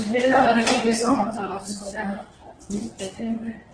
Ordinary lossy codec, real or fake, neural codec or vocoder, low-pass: Opus, 16 kbps; fake; codec, 24 kHz, 1 kbps, SNAC; 9.9 kHz